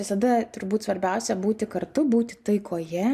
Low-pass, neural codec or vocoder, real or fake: 14.4 kHz; none; real